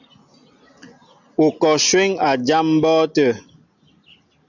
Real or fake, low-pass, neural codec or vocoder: real; 7.2 kHz; none